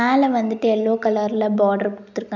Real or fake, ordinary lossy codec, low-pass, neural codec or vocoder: real; none; 7.2 kHz; none